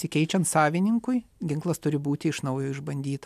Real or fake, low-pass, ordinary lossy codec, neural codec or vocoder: real; 14.4 kHz; AAC, 96 kbps; none